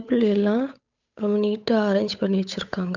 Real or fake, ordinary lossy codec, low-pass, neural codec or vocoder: fake; none; 7.2 kHz; codec, 16 kHz, 8 kbps, FunCodec, trained on Chinese and English, 25 frames a second